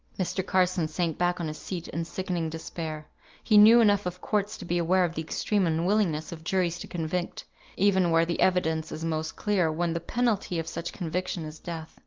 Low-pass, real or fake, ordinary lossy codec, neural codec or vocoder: 7.2 kHz; real; Opus, 32 kbps; none